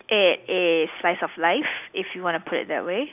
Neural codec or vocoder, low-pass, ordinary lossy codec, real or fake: none; 3.6 kHz; none; real